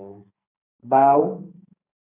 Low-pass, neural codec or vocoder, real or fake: 3.6 kHz; codec, 44.1 kHz, 2.6 kbps, SNAC; fake